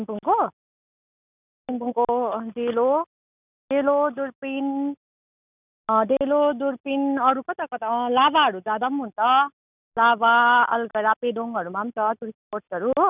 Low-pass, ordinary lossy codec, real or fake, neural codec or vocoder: 3.6 kHz; none; real; none